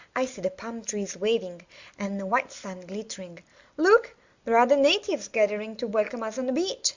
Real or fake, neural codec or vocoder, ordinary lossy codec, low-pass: real; none; Opus, 64 kbps; 7.2 kHz